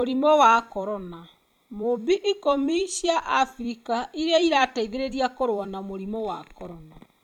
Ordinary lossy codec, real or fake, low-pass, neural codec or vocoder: none; fake; 19.8 kHz; vocoder, 44.1 kHz, 128 mel bands every 256 samples, BigVGAN v2